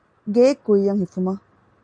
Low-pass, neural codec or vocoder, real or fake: 9.9 kHz; none; real